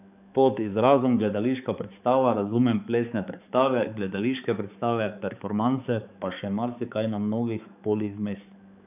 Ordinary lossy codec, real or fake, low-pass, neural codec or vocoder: none; fake; 3.6 kHz; codec, 16 kHz, 4 kbps, X-Codec, HuBERT features, trained on balanced general audio